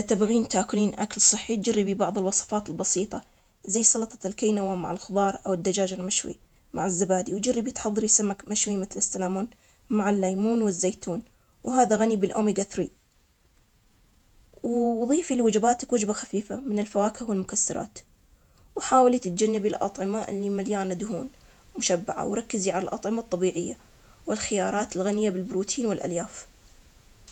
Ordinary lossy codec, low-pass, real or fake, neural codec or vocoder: none; 19.8 kHz; fake; vocoder, 48 kHz, 128 mel bands, Vocos